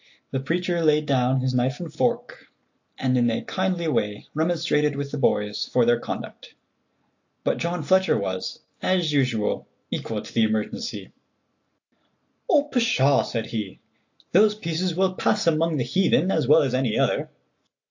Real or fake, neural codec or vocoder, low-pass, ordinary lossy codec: real; none; 7.2 kHz; AAC, 48 kbps